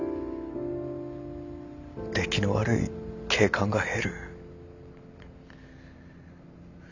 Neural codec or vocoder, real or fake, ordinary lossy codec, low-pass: none; real; none; 7.2 kHz